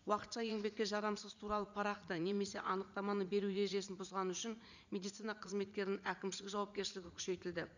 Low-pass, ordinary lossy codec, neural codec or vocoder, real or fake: 7.2 kHz; none; vocoder, 44.1 kHz, 80 mel bands, Vocos; fake